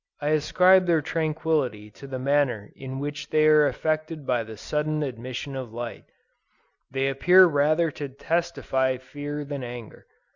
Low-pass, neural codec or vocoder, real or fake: 7.2 kHz; none; real